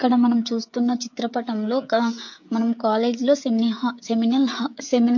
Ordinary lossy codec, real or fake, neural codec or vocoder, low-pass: MP3, 48 kbps; fake; codec, 16 kHz, 16 kbps, FreqCodec, smaller model; 7.2 kHz